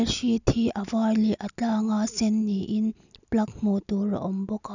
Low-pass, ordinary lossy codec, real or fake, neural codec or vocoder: 7.2 kHz; none; real; none